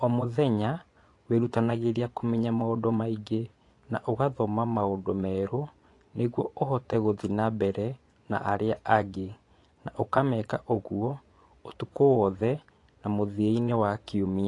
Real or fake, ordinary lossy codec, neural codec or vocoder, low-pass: fake; AAC, 48 kbps; vocoder, 24 kHz, 100 mel bands, Vocos; 10.8 kHz